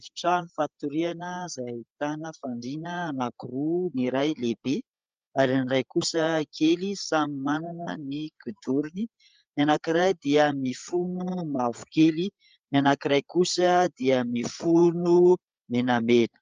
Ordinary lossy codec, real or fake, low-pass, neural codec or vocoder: Opus, 24 kbps; fake; 7.2 kHz; codec, 16 kHz, 8 kbps, FreqCodec, larger model